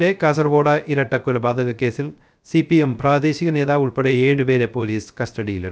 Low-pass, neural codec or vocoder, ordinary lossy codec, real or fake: none; codec, 16 kHz, 0.3 kbps, FocalCodec; none; fake